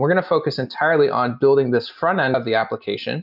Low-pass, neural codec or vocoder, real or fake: 5.4 kHz; none; real